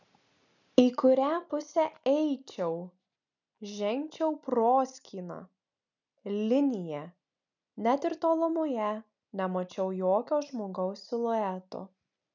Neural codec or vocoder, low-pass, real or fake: none; 7.2 kHz; real